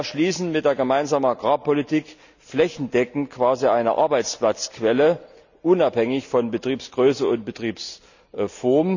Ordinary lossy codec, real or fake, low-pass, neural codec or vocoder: none; real; 7.2 kHz; none